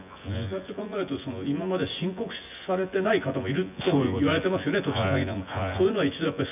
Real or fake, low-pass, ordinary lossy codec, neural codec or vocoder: fake; 3.6 kHz; none; vocoder, 24 kHz, 100 mel bands, Vocos